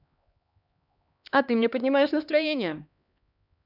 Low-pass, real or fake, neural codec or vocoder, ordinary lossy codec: 5.4 kHz; fake; codec, 16 kHz, 2 kbps, X-Codec, HuBERT features, trained on LibriSpeech; none